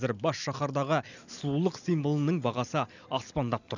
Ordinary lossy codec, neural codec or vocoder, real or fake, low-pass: none; none; real; 7.2 kHz